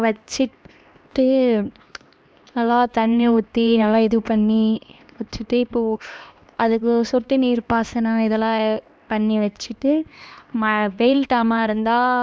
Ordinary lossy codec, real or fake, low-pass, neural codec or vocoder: none; fake; none; codec, 16 kHz, 2 kbps, X-Codec, HuBERT features, trained on LibriSpeech